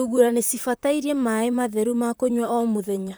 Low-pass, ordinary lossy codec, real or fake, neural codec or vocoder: none; none; fake; vocoder, 44.1 kHz, 128 mel bands, Pupu-Vocoder